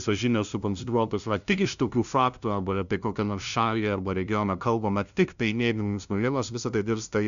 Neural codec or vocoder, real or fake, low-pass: codec, 16 kHz, 0.5 kbps, FunCodec, trained on LibriTTS, 25 frames a second; fake; 7.2 kHz